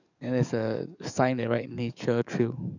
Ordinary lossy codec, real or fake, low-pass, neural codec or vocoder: none; fake; 7.2 kHz; codec, 44.1 kHz, 7.8 kbps, DAC